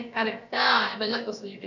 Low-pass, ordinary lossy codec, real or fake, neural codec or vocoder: 7.2 kHz; none; fake; codec, 16 kHz, about 1 kbps, DyCAST, with the encoder's durations